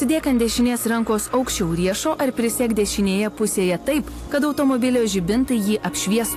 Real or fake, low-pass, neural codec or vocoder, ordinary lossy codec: real; 14.4 kHz; none; AAC, 64 kbps